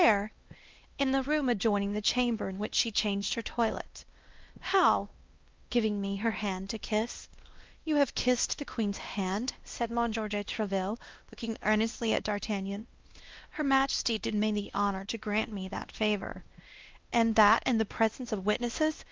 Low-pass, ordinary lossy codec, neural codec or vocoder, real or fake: 7.2 kHz; Opus, 32 kbps; codec, 16 kHz, 1 kbps, X-Codec, WavLM features, trained on Multilingual LibriSpeech; fake